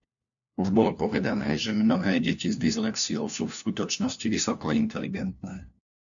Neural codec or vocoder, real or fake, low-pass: codec, 16 kHz, 1 kbps, FunCodec, trained on LibriTTS, 50 frames a second; fake; 7.2 kHz